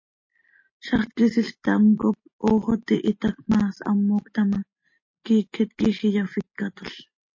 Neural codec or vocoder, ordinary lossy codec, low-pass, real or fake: none; MP3, 32 kbps; 7.2 kHz; real